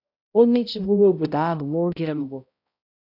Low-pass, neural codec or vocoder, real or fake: 5.4 kHz; codec, 16 kHz, 0.5 kbps, X-Codec, HuBERT features, trained on balanced general audio; fake